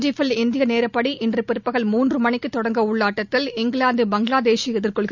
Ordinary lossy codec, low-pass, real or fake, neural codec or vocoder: none; 7.2 kHz; real; none